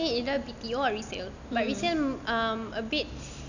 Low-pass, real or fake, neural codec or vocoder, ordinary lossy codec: 7.2 kHz; real; none; none